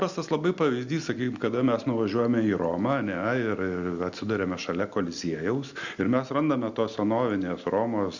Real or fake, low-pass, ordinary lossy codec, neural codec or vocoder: real; 7.2 kHz; Opus, 64 kbps; none